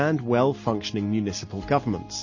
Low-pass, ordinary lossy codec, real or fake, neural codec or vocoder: 7.2 kHz; MP3, 32 kbps; real; none